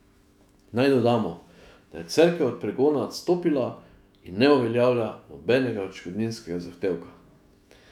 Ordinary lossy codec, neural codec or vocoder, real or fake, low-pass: none; autoencoder, 48 kHz, 128 numbers a frame, DAC-VAE, trained on Japanese speech; fake; 19.8 kHz